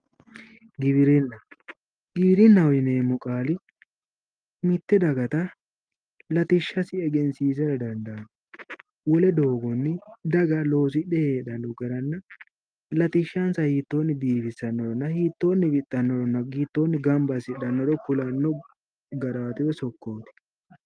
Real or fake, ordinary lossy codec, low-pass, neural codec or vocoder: real; Opus, 32 kbps; 9.9 kHz; none